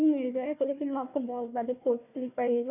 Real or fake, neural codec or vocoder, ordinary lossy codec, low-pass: fake; codec, 16 kHz, 1 kbps, FunCodec, trained on Chinese and English, 50 frames a second; none; 3.6 kHz